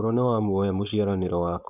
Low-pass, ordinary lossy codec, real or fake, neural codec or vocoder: 3.6 kHz; none; fake; codec, 16 kHz, 4.8 kbps, FACodec